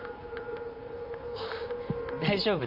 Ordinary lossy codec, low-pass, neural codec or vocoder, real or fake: none; 5.4 kHz; vocoder, 44.1 kHz, 128 mel bands, Pupu-Vocoder; fake